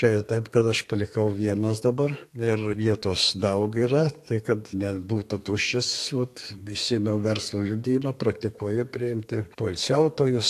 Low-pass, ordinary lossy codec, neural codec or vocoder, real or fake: 14.4 kHz; AAC, 64 kbps; codec, 32 kHz, 1.9 kbps, SNAC; fake